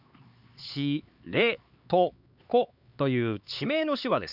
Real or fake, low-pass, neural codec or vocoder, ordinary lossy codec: fake; 5.4 kHz; codec, 16 kHz, 4 kbps, X-Codec, HuBERT features, trained on LibriSpeech; Opus, 64 kbps